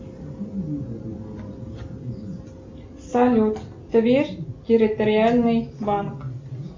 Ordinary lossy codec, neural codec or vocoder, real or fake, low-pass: MP3, 64 kbps; none; real; 7.2 kHz